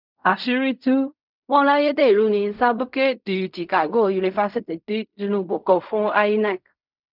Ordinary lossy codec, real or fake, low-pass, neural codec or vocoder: none; fake; 5.4 kHz; codec, 16 kHz in and 24 kHz out, 0.4 kbps, LongCat-Audio-Codec, fine tuned four codebook decoder